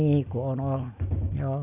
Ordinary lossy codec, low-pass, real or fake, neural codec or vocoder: none; 3.6 kHz; real; none